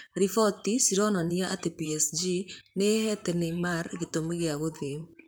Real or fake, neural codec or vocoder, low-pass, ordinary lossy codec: fake; vocoder, 44.1 kHz, 128 mel bands, Pupu-Vocoder; none; none